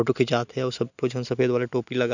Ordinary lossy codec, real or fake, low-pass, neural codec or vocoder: none; real; 7.2 kHz; none